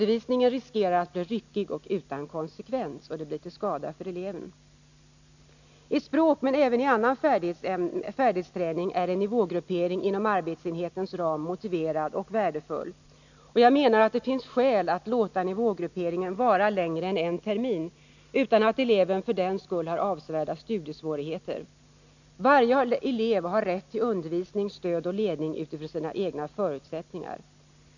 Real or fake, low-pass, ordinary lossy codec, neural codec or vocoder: real; 7.2 kHz; none; none